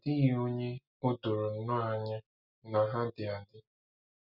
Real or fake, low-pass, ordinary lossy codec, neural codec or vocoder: real; 5.4 kHz; none; none